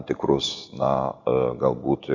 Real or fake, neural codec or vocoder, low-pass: real; none; 7.2 kHz